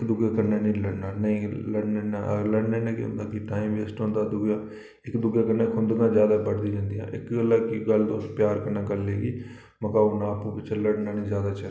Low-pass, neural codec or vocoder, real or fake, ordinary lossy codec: none; none; real; none